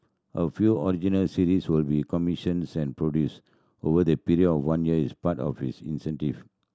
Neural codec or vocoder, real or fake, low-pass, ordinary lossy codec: none; real; none; none